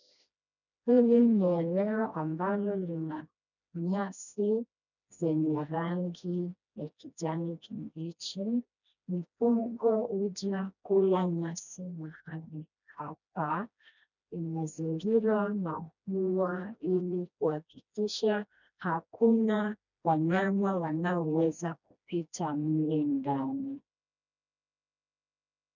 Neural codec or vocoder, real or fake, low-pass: codec, 16 kHz, 1 kbps, FreqCodec, smaller model; fake; 7.2 kHz